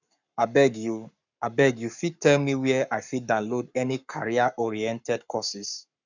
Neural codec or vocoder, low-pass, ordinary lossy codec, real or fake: codec, 44.1 kHz, 7.8 kbps, Pupu-Codec; 7.2 kHz; none; fake